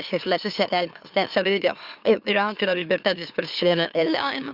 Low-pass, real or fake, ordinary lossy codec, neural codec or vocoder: 5.4 kHz; fake; Opus, 64 kbps; autoencoder, 44.1 kHz, a latent of 192 numbers a frame, MeloTTS